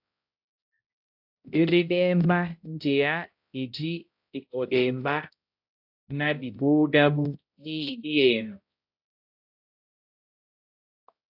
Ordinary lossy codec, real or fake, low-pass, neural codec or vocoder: AAC, 48 kbps; fake; 5.4 kHz; codec, 16 kHz, 0.5 kbps, X-Codec, HuBERT features, trained on balanced general audio